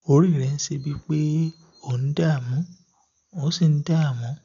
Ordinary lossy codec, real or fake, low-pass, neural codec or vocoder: none; real; 7.2 kHz; none